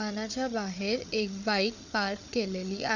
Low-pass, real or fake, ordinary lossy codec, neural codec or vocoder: 7.2 kHz; fake; none; codec, 16 kHz, 8 kbps, FreqCodec, larger model